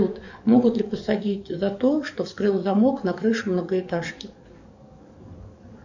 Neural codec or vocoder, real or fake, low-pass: codec, 44.1 kHz, 7.8 kbps, DAC; fake; 7.2 kHz